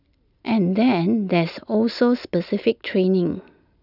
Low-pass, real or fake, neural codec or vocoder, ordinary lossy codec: 5.4 kHz; real; none; none